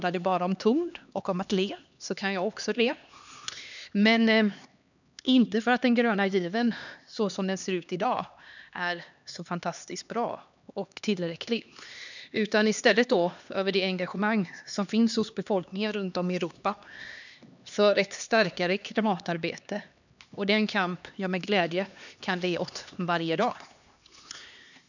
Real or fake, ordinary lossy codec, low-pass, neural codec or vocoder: fake; none; 7.2 kHz; codec, 16 kHz, 2 kbps, X-Codec, HuBERT features, trained on LibriSpeech